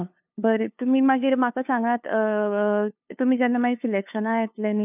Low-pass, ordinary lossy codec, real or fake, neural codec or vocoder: 3.6 kHz; none; fake; codec, 16 kHz, 2 kbps, FunCodec, trained on LibriTTS, 25 frames a second